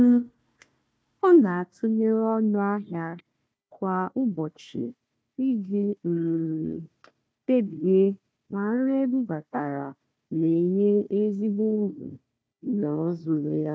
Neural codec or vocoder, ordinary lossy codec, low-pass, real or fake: codec, 16 kHz, 1 kbps, FunCodec, trained on Chinese and English, 50 frames a second; none; none; fake